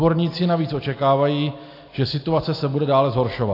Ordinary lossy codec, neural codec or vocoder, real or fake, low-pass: AAC, 32 kbps; none; real; 5.4 kHz